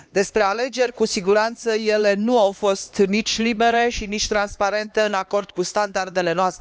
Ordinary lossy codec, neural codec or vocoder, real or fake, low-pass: none; codec, 16 kHz, 2 kbps, X-Codec, HuBERT features, trained on LibriSpeech; fake; none